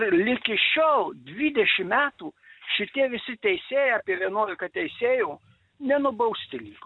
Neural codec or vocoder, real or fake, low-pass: none; real; 9.9 kHz